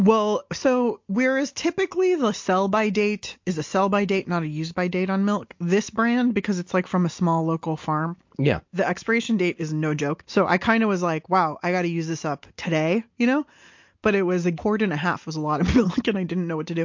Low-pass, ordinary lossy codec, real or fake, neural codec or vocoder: 7.2 kHz; MP3, 48 kbps; real; none